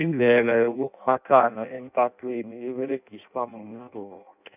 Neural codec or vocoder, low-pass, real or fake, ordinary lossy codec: codec, 16 kHz in and 24 kHz out, 0.6 kbps, FireRedTTS-2 codec; 3.6 kHz; fake; none